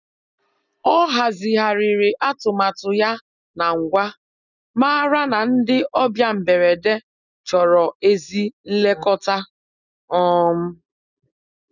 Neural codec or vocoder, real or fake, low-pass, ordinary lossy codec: none; real; 7.2 kHz; none